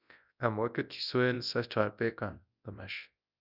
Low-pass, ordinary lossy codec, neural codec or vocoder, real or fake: 5.4 kHz; Opus, 64 kbps; codec, 24 kHz, 0.9 kbps, WavTokenizer, large speech release; fake